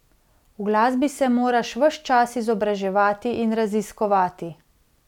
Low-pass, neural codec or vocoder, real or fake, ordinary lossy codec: 19.8 kHz; none; real; none